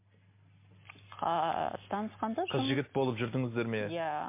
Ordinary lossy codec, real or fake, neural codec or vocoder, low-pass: MP3, 24 kbps; real; none; 3.6 kHz